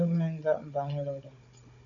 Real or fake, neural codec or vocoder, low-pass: fake; codec, 16 kHz, 16 kbps, FunCodec, trained on Chinese and English, 50 frames a second; 7.2 kHz